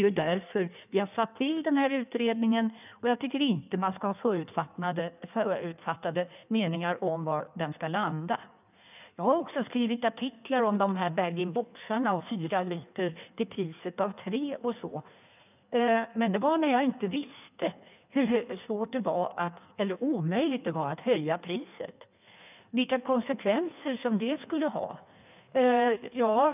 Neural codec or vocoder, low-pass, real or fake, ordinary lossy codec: codec, 16 kHz in and 24 kHz out, 1.1 kbps, FireRedTTS-2 codec; 3.6 kHz; fake; none